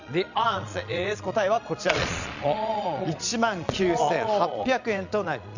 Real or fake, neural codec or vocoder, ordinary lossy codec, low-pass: fake; vocoder, 22.05 kHz, 80 mel bands, Vocos; none; 7.2 kHz